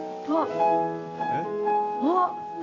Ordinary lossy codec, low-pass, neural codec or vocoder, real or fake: AAC, 48 kbps; 7.2 kHz; none; real